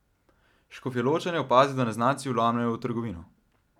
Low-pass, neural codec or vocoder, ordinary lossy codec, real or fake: 19.8 kHz; none; none; real